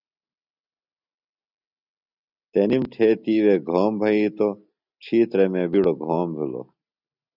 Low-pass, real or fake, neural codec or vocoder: 5.4 kHz; real; none